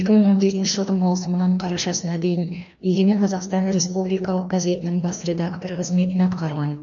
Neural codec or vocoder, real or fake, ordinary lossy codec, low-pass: codec, 16 kHz, 1 kbps, FreqCodec, larger model; fake; none; 7.2 kHz